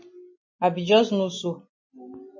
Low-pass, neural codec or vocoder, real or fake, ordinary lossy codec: 7.2 kHz; none; real; MP3, 32 kbps